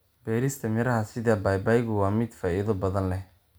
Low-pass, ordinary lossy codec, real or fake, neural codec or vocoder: none; none; real; none